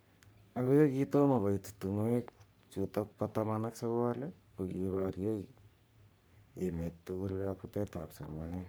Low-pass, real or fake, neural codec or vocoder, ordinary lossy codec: none; fake; codec, 44.1 kHz, 3.4 kbps, Pupu-Codec; none